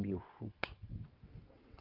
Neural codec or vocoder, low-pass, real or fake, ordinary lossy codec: vocoder, 22.05 kHz, 80 mel bands, WaveNeXt; 5.4 kHz; fake; Opus, 32 kbps